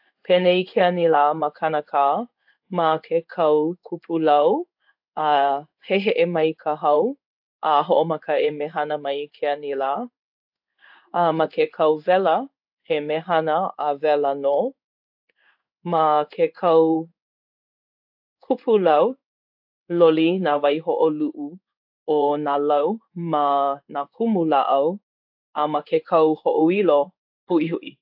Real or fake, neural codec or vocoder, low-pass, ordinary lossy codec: fake; codec, 16 kHz in and 24 kHz out, 1 kbps, XY-Tokenizer; 5.4 kHz; AAC, 48 kbps